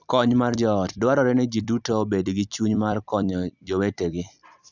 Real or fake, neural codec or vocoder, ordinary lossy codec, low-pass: fake; vocoder, 44.1 kHz, 128 mel bands every 256 samples, BigVGAN v2; none; 7.2 kHz